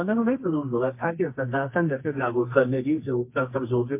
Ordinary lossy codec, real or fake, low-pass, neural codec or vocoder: MP3, 24 kbps; fake; 3.6 kHz; codec, 24 kHz, 0.9 kbps, WavTokenizer, medium music audio release